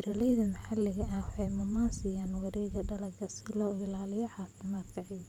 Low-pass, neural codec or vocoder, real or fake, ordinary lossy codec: 19.8 kHz; vocoder, 44.1 kHz, 128 mel bands every 512 samples, BigVGAN v2; fake; none